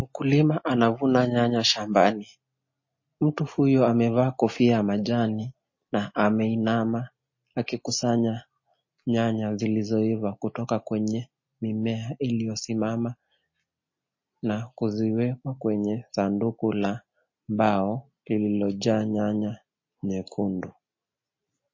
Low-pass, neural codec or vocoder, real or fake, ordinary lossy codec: 7.2 kHz; none; real; MP3, 32 kbps